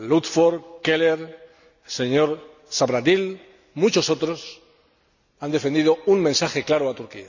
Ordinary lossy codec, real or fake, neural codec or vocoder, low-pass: none; real; none; 7.2 kHz